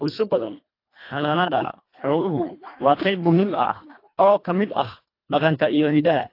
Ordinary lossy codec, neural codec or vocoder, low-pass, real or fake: AAC, 32 kbps; codec, 24 kHz, 1.5 kbps, HILCodec; 5.4 kHz; fake